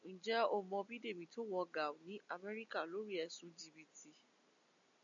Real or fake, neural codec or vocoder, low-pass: real; none; 7.2 kHz